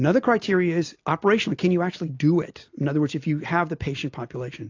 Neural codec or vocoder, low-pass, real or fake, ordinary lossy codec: none; 7.2 kHz; real; AAC, 48 kbps